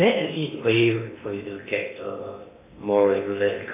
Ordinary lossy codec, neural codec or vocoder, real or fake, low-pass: AAC, 24 kbps; codec, 16 kHz in and 24 kHz out, 0.8 kbps, FocalCodec, streaming, 65536 codes; fake; 3.6 kHz